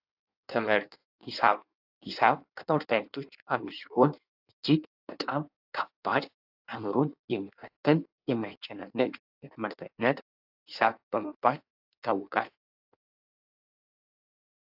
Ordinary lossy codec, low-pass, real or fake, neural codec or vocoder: AAC, 48 kbps; 5.4 kHz; fake; codec, 16 kHz in and 24 kHz out, 1.1 kbps, FireRedTTS-2 codec